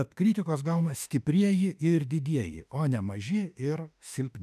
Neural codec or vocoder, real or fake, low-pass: autoencoder, 48 kHz, 32 numbers a frame, DAC-VAE, trained on Japanese speech; fake; 14.4 kHz